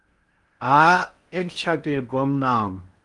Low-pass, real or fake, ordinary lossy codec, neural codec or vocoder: 10.8 kHz; fake; Opus, 32 kbps; codec, 16 kHz in and 24 kHz out, 0.6 kbps, FocalCodec, streaming, 4096 codes